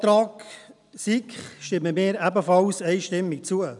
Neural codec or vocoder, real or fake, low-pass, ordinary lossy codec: none; real; 10.8 kHz; none